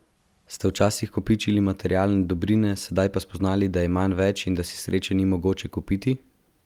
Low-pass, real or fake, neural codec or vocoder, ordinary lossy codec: 19.8 kHz; real; none; Opus, 32 kbps